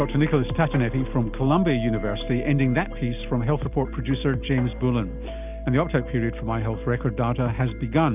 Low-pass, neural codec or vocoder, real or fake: 3.6 kHz; none; real